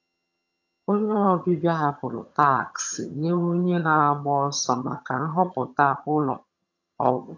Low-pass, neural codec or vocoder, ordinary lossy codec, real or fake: 7.2 kHz; vocoder, 22.05 kHz, 80 mel bands, HiFi-GAN; AAC, 48 kbps; fake